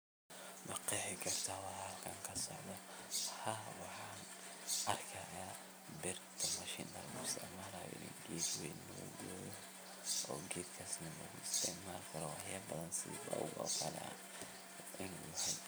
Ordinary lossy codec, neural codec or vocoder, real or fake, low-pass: none; vocoder, 44.1 kHz, 128 mel bands every 256 samples, BigVGAN v2; fake; none